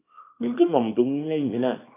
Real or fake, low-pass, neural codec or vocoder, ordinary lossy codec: fake; 3.6 kHz; codec, 24 kHz, 0.9 kbps, WavTokenizer, small release; AAC, 24 kbps